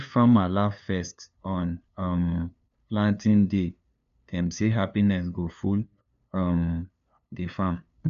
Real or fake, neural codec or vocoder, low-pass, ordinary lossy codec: fake; codec, 16 kHz, 2 kbps, FunCodec, trained on LibriTTS, 25 frames a second; 7.2 kHz; none